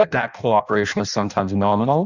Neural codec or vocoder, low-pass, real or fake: codec, 16 kHz in and 24 kHz out, 0.6 kbps, FireRedTTS-2 codec; 7.2 kHz; fake